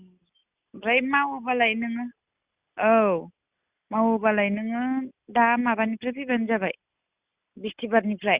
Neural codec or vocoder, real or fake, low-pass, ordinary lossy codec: none; real; 3.6 kHz; Opus, 64 kbps